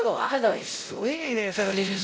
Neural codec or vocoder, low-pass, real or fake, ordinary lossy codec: codec, 16 kHz, 0.5 kbps, X-Codec, WavLM features, trained on Multilingual LibriSpeech; none; fake; none